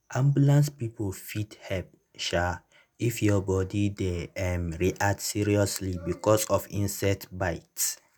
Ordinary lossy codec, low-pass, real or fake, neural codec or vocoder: none; none; real; none